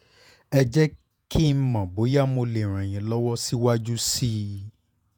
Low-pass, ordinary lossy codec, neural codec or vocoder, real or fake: 19.8 kHz; none; vocoder, 48 kHz, 128 mel bands, Vocos; fake